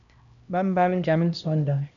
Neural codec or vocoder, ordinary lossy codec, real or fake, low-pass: codec, 16 kHz, 1 kbps, X-Codec, HuBERT features, trained on LibriSpeech; MP3, 96 kbps; fake; 7.2 kHz